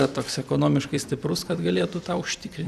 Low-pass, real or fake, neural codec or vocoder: 14.4 kHz; fake; vocoder, 44.1 kHz, 128 mel bands every 256 samples, BigVGAN v2